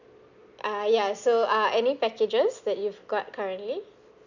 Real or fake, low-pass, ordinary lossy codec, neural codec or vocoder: real; 7.2 kHz; none; none